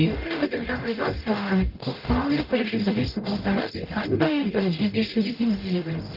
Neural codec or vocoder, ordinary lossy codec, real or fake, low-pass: codec, 44.1 kHz, 0.9 kbps, DAC; Opus, 32 kbps; fake; 5.4 kHz